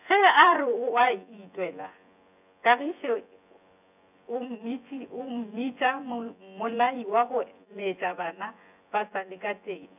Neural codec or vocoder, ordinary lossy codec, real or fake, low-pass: vocoder, 24 kHz, 100 mel bands, Vocos; none; fake; 3.6 kHz